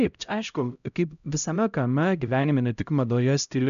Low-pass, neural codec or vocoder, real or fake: 7.2 kHz; codec, 16 kHz, 0.5 kbps, X-Codec, HuBERT features, trained on LibriSpeech; fake